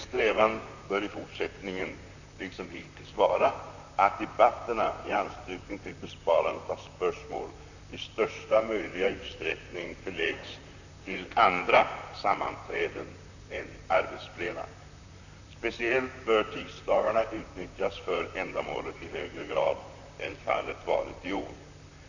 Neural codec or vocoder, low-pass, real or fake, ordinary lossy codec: vocoder, 44.1 kHz, 128 mel bands, Pupu-Vocoder; 7.2 kHz; fake; none